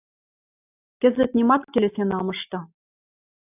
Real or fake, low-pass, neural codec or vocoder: real; 3.6 kHz; none